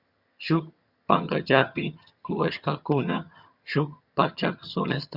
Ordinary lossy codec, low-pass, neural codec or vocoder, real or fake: Opus, 64 kbps; 5.4 kHz; vocoder, 22.05 kHz, 80 mel bands, HiFi-GAN; fake